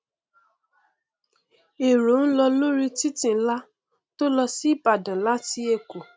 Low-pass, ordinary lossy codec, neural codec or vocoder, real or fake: none; none; none; real